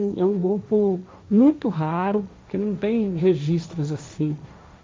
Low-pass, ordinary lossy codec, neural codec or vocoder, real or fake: none; none; codec, 16 kHz, 1.1 kbps, Voila-Tokenizer; fake